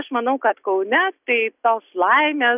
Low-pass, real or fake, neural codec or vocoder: 3.6 kHz; real; none